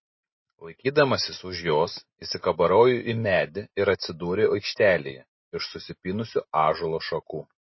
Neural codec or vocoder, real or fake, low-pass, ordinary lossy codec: none; real; 7.2 kHz; MP3, 24 kbps